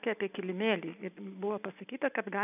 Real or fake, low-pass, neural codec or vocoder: real; 3.6 kHz; none